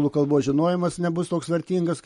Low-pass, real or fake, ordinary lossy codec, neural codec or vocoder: 19.8 kHz; real; MP3, 48 kbps; none